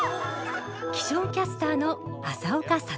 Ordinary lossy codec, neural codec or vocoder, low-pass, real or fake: none; none; none; real